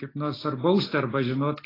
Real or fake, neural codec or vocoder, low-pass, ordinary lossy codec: real; none; 5.4 kHz; AAC, 24 kbps